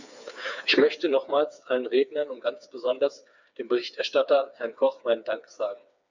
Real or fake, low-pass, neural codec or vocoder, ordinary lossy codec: fake; 7.2 kHz; codec, 16 kHz, 4 kbps, FreqCodec, smaller model; none